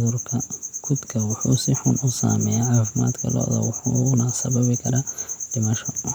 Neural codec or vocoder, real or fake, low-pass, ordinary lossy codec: none; real; none; none